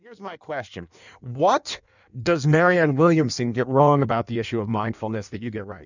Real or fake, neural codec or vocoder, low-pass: fake; codec, 16 kHz in and 24 kHz out, 1.1 kbps, FireRedTTS-2 codec; 7.2 kHz